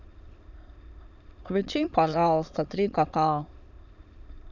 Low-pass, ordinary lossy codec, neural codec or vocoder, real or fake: 7.2 kHz; none; autoencoder, 22.05 kHz, a latent of 192 numbers a frame, VITS, trained on many speakers; fake